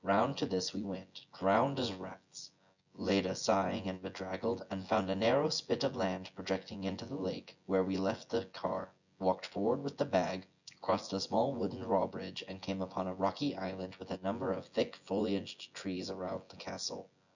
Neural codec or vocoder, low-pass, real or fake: vocoder, 24 kHz, 100 mel bands, Vocos; 7.2 kHz; fake